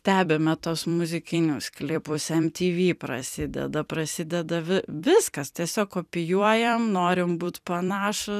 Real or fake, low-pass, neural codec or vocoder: fake; 14.4 kHz; vocoder, 44.1 kHz, 128 mel bands every 256 samples, BigVGAN v2